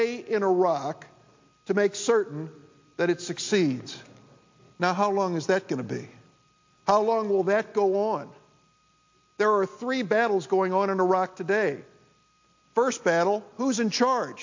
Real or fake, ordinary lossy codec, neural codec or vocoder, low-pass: real; MP3, 48 kbps; none; 7.2 kHz